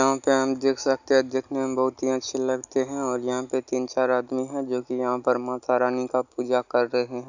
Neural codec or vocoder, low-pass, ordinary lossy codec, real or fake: none; 7.2 kHz; none; real